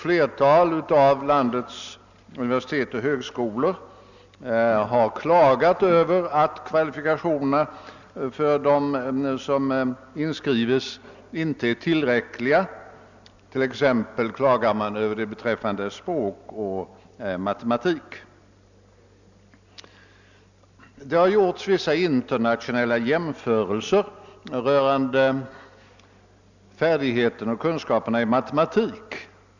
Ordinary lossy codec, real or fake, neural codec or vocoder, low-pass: none; real; none; 7.2 kHz